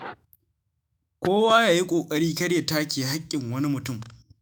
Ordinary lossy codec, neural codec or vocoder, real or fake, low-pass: none; autoencoder, 48 kHz, 128 numbers a frame, DAC-VAE, trained on Japanese speech; fake; none